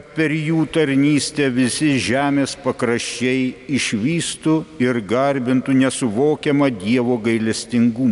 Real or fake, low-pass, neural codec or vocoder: real; 10.8 kHz; none